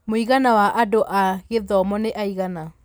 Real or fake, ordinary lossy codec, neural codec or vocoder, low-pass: real; none; none; none